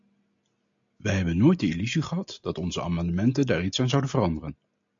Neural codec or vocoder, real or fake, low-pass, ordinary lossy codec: none; real; 7.2 kHz; AAC, 64 kbps